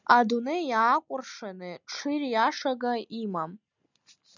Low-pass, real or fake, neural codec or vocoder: 7.2 kHz; real; none